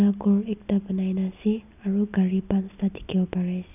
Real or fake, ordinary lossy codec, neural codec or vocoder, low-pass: real; none; none; 3.6 kHz